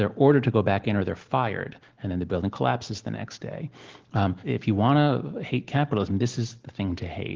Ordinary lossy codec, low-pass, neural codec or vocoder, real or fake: Opus, 16 kbps; 7.2 kHz; none; real